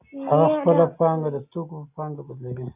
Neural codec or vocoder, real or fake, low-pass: none; real; 3.6 kHz